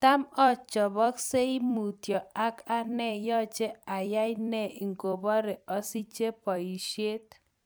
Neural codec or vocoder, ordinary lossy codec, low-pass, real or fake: vocoder, 44.1 kHz, 128 mel bands every 256 samples, BigVGAN v2; none; none; fake